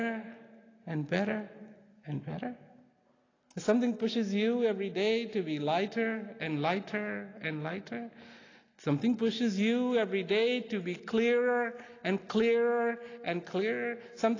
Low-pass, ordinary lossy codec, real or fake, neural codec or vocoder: 7.2 kHz; AAC, 32 kbps; real; none